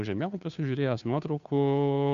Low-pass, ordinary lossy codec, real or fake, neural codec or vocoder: 7.2 kHz; Opus, 64 kbps; fake; codec, 16 kHz, 2 kbps, FunCodec, trained on Chinese and English, 25 frames a second